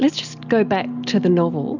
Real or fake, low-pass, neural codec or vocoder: real; 7.2 kHz; none